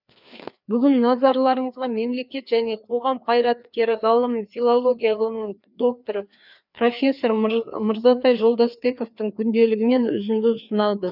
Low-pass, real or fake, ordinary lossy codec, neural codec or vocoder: 5.4 kHz; fake; none; codec, 16 kHz, 2 kbps, FreqCodec, larger model